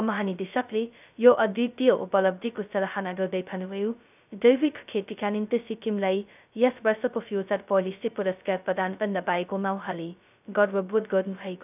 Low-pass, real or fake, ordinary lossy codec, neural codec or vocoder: 3.6 kHz; fake; none; codec, 16 kHz, 0.2 kbps, FocalCodec